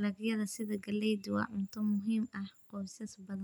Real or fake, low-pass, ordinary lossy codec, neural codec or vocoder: real; 19.8 kHz; none; none